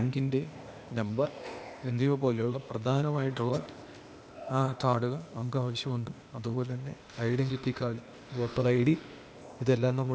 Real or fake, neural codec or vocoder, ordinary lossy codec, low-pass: fake; codec, 16 kHz, 0.8 kbps, ZipCodec; none; none